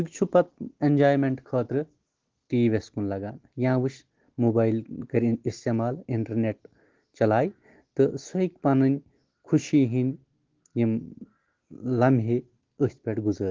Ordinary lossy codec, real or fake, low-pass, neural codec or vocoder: Opus, 16 kbps; real; 7.2 kHz; none